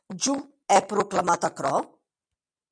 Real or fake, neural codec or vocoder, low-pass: real; none; 9.9 kHz